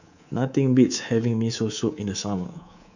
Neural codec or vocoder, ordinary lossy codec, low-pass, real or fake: codec, 24 kHz, 3.1 kbps, DualCodec; none; 7.2 kHz; fake